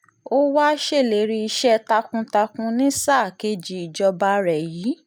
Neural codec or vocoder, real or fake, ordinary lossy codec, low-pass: none; real; none; none